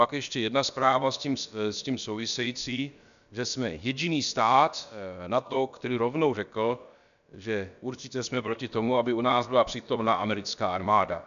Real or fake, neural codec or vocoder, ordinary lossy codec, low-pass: fake; codec, 16 kHz, about 1 kbps, DyCAST, with the encoder's durations; MP3, 96 kbps; 7.2 kHz